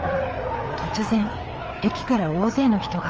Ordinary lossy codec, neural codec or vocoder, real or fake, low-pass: Opus, 24 kbps; codec, 16 kHz, 4 kbps, FreqCodec, larger model; fake; 7.2 kHz